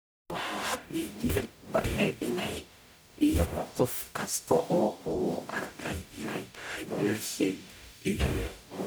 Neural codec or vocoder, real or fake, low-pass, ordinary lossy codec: codec, 44.1 kHz, 0.9 kbps, DAC; fake; none; none